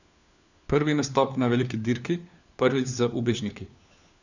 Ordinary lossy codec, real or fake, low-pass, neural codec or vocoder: none; fake; 7.2 kHz; codec, 16 kHz, 4 kbps, FunCodec, trained on LibriTTS, 50 frames a second